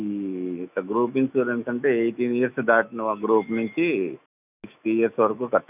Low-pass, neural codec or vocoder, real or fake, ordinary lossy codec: 3.6 kHz; autoencoder, 48 kHz, 128 numbers a frame, DAC-VAE, trained on Japanese speech; fake; none